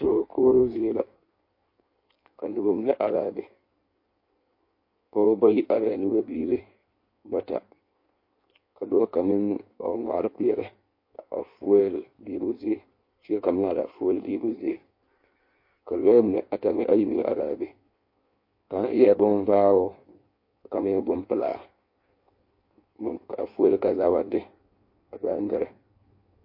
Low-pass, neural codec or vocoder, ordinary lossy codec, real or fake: 5.4 kHz; codec, 16 kHz in and 24 kHz out, 1.1 kbps, FireRedTTS-2 codec; MP3, 32 kbps; fake